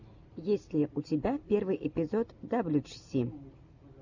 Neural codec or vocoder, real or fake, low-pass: none; real; 7.2 kHz